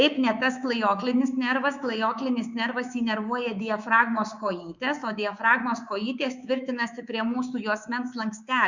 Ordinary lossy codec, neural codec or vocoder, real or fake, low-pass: Opus, 64 kbps; codec, 24 kHz, 3.1 kbps, DualCodec; fake; 7.2 kHz